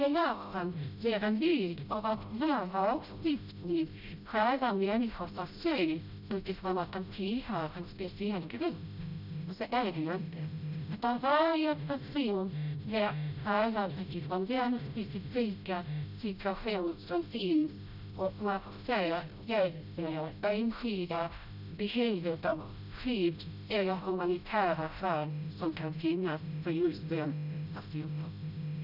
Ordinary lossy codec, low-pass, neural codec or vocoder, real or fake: none; 5.4 kHz; codec, 16 kHz, 0.5 kbps, FreqCodec, smaller model; fake